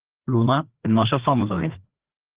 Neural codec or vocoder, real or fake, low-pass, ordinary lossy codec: codec, 16 kHz, 2 kbps, FreqCodec, larger model; fake; 3.6 kHz; Opus, 16 kbps